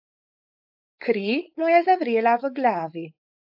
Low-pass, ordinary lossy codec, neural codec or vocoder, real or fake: 5.4 kHz; AAC, 48 kbps; codec, 16 kHz, 4.8 kbps, FACodec; fake